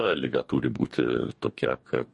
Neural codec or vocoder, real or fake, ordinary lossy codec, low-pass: codec, 44.1 kHz, 2.6 kbps, DAC; fake; MP3, 48 kbps; 10.8 kHz